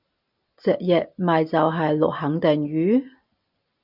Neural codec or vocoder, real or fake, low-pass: none; real; 5.4 kHz